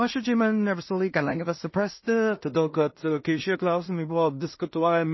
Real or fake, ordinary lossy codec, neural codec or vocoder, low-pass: fake; MP3, 24 kbps; codec, 16 kHz in and 24 kHz out, 0.4 kbps, LongCat-Audio-Codec, two codebook decoder; 7.2 kHz